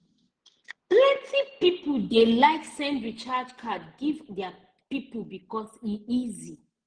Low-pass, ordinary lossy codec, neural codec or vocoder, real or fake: 9.9 kHz; Opus, 16 kbps; none; real